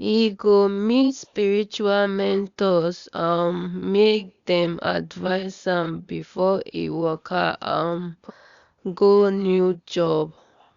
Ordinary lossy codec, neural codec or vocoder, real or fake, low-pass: Opus, 64 kbps; codec, 16 kHz, 0.8 kbps, ZipCodec; fake; 7.2 kHz